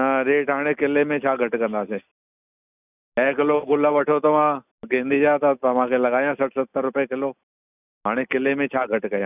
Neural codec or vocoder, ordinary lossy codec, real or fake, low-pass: none; none; real; 3.6 kHz